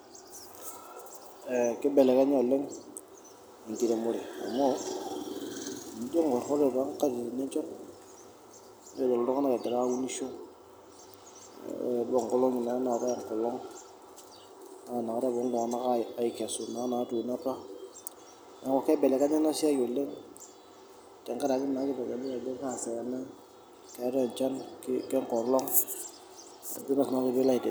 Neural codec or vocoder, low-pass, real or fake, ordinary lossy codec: none; none; real; none